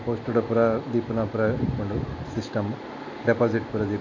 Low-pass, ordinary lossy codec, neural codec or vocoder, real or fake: 7.2 kHz; AAC, 48 kbps; none; real